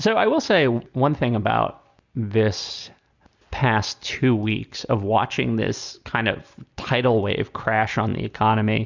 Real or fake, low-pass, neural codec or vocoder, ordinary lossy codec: real; 7.2 kHz; none; Opus, 64 kbps